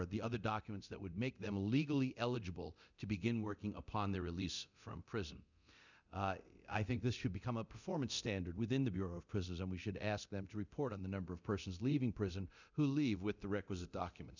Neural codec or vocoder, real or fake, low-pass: codec, 24 kHz, 0.9 kbps, DualCodec; fake; 7.2 kHz